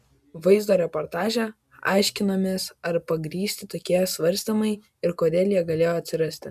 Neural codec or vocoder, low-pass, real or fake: none; 14.4 kHz; real